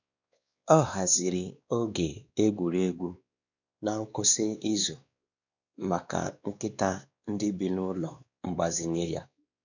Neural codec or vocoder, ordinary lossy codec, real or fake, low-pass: codec, 16 kHz, 2 kbps, X-Codec, WavLM features, trained on Multilingual LibriSpeech; none; fake; 7.2 kHz